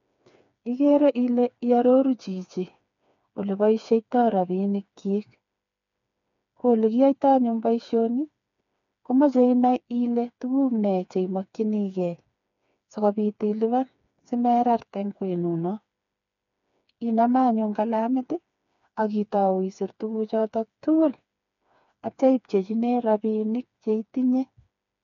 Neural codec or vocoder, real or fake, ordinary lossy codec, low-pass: codec, 16 kHz, 4 kbps, FreqCodec, smaller model; fake; none; 7.2 kHz